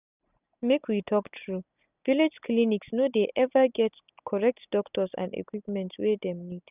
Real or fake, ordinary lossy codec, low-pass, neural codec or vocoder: real; none; 3.6 kHz; none